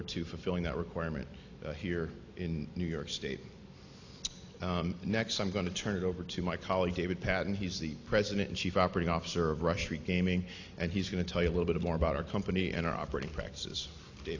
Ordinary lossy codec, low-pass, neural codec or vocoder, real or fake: AAC, 48 kbps; 7.2 kHz; none; real